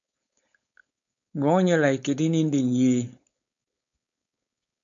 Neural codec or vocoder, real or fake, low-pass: codec, 16 kHz, 4.8 kbps, FACodec; fake; 7.2 kHz